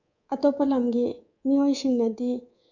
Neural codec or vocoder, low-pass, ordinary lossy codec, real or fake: codec, 24 kHz, 3.1 kbps, DualCodec; 7.2 kHz; none; fake